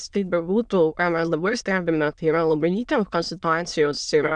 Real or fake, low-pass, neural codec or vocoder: fake; 9.9 kHz; autoencoder, 22.05 kHz, a latent of 192 numbers a frame, VITS, trained on many speakers